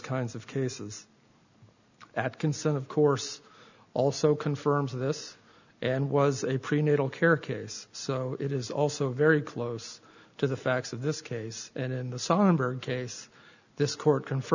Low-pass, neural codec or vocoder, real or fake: 7.2 kHz; none; real